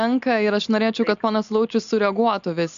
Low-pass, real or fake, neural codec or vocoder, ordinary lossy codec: 7.2 kHz; real; none; MP3, 64 kbps